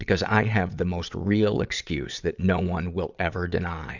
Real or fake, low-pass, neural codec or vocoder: fake; 7.2 kHz; vocoder, 22.05 kHz, 80 mel bands, Vocos